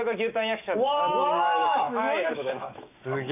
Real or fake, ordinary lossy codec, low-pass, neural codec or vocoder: fake; none; 3.6 kHz; autoencoder, 48 kHz, 128 numbers a frame, DAC-VAE, trained on Japanese speech